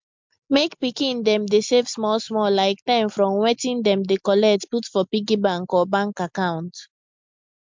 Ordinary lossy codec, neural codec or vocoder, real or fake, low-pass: MP3, 64 kbps; none; real; 7.2 kHz